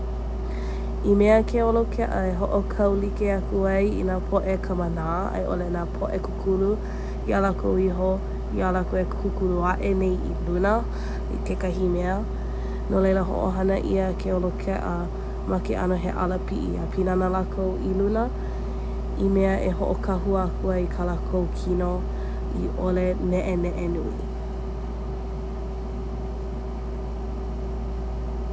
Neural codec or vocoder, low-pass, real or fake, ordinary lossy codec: none; none; real; none